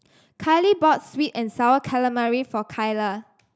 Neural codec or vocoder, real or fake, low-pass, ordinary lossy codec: none; real; none; none